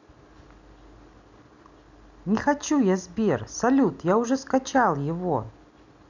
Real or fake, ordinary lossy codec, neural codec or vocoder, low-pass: real; none; none; 7.2 kHz